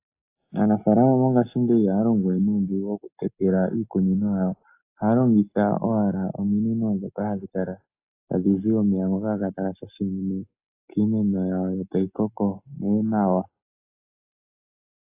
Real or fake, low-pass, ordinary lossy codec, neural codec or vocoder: real; 3.6 kHz; AAC, 24 kbps; none